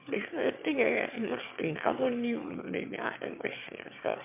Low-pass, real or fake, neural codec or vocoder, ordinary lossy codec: 3.6 kHz; fake; autoencoder, 22.05 kHz, a latent of 192 numbers a frame, VITS, trained on one speaker; MP3, 32 kbps